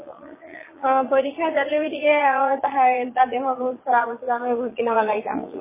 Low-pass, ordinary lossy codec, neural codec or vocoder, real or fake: 3.6 kHz; MP3, 16 kbps; vocoder, 22.05 kHz, 80 mel bands, Vocos; fake